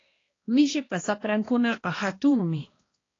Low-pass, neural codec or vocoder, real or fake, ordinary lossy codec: 7.2 kHz; codec, 16 kHz, 1 kbps, X-Codec, HuBERT features, trained on balanced general audio; fake; AAC, 32 kbps